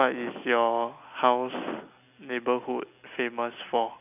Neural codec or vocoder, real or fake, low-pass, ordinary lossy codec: none; real; 3.6 kHz; none